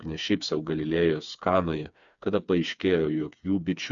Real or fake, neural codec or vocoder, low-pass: fake; codec, 16 kHz, 4 kbps, FreqCodec, smaller model; 7.2 kHz